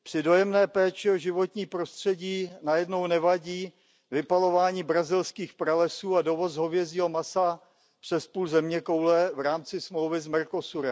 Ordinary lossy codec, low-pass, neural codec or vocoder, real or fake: none; none; none; real